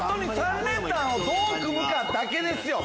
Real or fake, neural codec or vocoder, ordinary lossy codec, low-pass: real; none; none; none